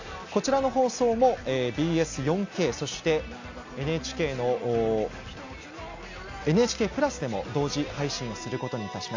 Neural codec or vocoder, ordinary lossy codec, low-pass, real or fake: none; AAC, 48 kbps; 7.2 kHz; real